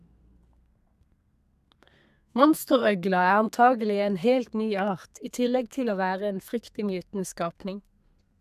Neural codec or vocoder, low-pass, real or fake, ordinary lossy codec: codec, 32 kHz, 1.9 kbps, SNAC; 14.4 kHz; fake; none